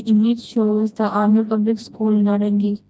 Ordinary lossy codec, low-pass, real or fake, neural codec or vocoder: none; none; fake; codec, 16 kHz, 1 kbps, FreqCodec, smaller model